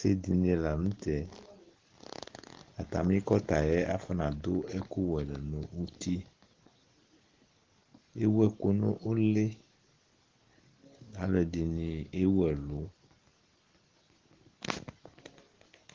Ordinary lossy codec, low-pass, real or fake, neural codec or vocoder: Opus, 16 kbps; 7.2 kHz; real; none